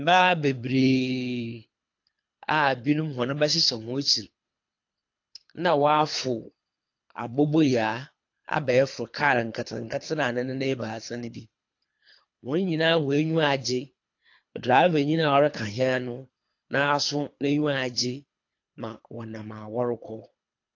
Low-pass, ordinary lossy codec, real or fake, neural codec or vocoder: 7.2 kHz; AAC, 48 kbps; fake; codec, 24 kHz, 3 kbps, HILCodec